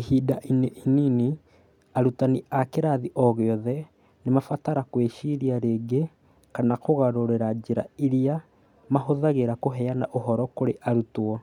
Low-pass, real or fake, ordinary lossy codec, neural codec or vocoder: 19.8 kHz; real; none; none